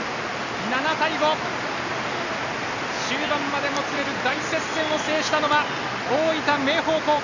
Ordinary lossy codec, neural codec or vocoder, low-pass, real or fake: none; none; 7.2 kHz; real